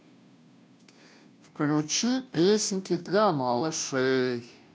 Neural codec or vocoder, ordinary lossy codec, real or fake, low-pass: codec, 16 kHz, 0.5 kbps, FunCodec, trained on Chinese and English, 25 frames a second; none; fake; none